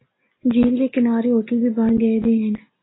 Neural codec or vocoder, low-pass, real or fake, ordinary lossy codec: none; 7.2 kHz; real; AAC, 16 kbps